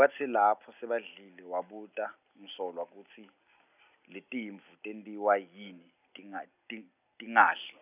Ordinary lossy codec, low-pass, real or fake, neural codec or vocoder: none; 3.6 kHz; real; none